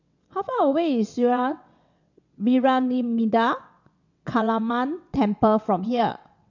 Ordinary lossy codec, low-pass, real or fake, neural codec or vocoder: none; 7.2 kHz; fake; vocoder, 22.05 kHz, 80 mel bands, WaveNeXt